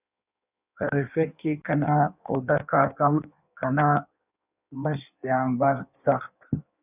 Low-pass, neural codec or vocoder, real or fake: 3.6 kHz; codec, 16 kHz in and 24 kHz out, 1.1 kbps, FireRedTTS-2 codec; fake